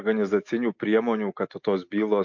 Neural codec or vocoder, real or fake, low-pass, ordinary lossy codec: none; real; 7.2 kHz; MP3, 48 kbps